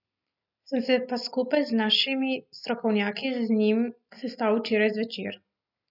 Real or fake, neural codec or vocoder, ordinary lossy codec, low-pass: real; none; none; 5.4 kHz